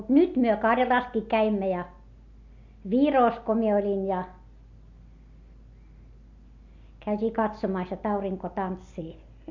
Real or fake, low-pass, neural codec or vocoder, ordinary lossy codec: real; 7.2 kHz; none; MP3, 48 kbps